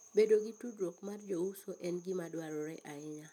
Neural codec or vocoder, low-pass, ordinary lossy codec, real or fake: none; 19.8 kHz; none; real